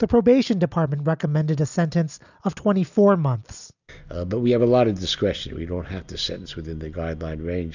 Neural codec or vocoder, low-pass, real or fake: none; 7.2 kHz; real